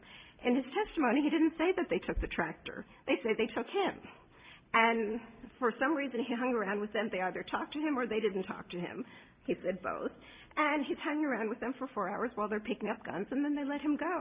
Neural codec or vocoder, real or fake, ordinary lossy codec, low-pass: none; real; Opus, 64 kbps; 3.6 kHz